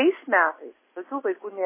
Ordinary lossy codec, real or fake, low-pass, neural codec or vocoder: MP3, 16 kbps; real; 3.6 kHz; none